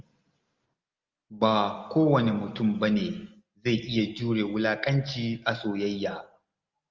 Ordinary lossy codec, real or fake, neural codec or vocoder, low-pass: Opus, 32 kbps; real; none; 7.2 kHz